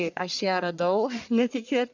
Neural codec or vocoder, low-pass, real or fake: codec, 44.1 kHz, 1.7 kbps, Pupu-Codec; 7.2 kHz; fake